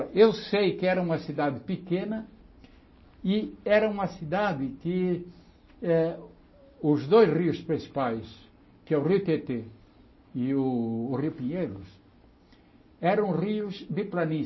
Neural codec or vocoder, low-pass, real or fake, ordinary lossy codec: none; 7.2 kHz; real; MP3, 24 kbps